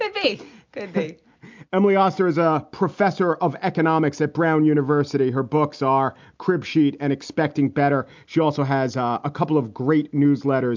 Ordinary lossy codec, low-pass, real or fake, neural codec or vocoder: MP3, 64 kbps; 7.2 kHz; real; none